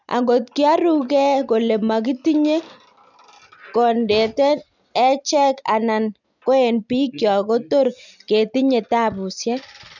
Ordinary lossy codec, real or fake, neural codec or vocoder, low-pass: none; real; none; 7.2 kHz